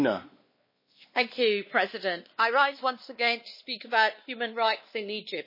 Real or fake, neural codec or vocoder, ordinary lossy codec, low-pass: fake; codec, 16 kHz, 2 kbps, X-Codec, WavLM features, trained on Multilingual LibriSpeech; MP3, 24 kbps; 5.4 kHz